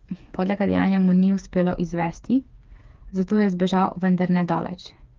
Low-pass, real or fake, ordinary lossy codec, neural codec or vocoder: 7.2 kHz; fake; Opus, 32 kbps; codec, 16 kHz, 4 kbps, FreqCodec, smaller model